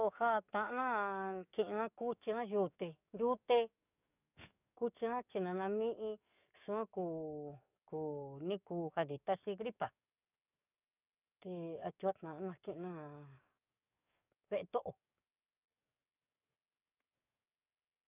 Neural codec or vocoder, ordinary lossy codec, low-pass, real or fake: codec, 44.1 kHz, 7.8 kbps, DAC; none; 3.6 kHz; fake